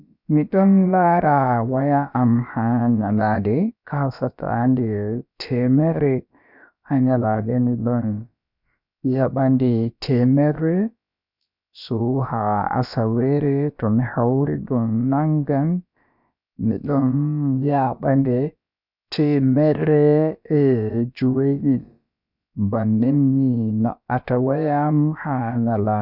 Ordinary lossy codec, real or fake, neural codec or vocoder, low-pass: none; fake; codec, 16 kHz, about 1 kbps, DyCAST, with the encoder's durations; 5.4 kHz